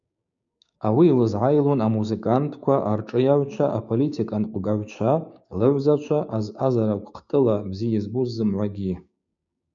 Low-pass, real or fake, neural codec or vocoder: 7.2 kHz; fake; codec, 16 kHz, 6 kbps, DAC